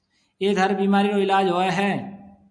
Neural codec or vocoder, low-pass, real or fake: none; 9.9 kHz; real